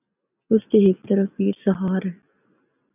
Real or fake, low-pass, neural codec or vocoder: real; 3.6 kHz; none